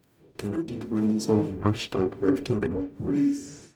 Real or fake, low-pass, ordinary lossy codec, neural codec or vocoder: fake; none; none; codec, 44.1 kHz, 0.9 kbps, DAC